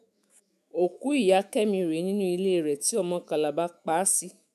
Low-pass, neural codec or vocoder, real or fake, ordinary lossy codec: 14.4 kHz; autoencoder, 48 kHz, 128 numbers a frame, DAC-VAE, trained on Japanese speech; fake; none